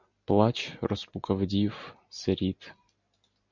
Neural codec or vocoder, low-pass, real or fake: none; 7.2 kHz; real